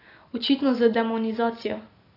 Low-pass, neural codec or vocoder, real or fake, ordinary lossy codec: 5.4 kHz; none; real; AAC, 24 kbps